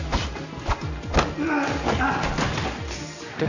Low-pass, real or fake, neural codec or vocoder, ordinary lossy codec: 7.2 kHz; real; none; none